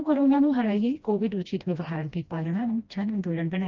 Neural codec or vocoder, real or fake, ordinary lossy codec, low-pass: codec, 16 kHz, 1 kbps, FreqCodec, smaller model; fake; Opus, 24 kbps; 7.2 kHz